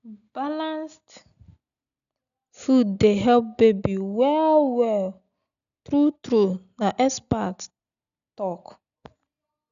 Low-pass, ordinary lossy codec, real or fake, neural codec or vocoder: 7.2 kHz; none; real; none